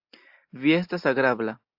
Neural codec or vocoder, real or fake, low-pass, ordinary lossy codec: none; real; 5.4 kHz; AAC, 48 kbps